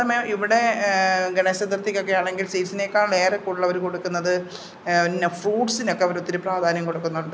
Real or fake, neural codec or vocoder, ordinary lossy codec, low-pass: real; none; none; none